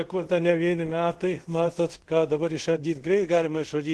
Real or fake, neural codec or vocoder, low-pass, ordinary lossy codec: fake; codec, 24 kHz, 0.5 kbps, DualCodec; 10.8 kHz; Opus, 16 kbps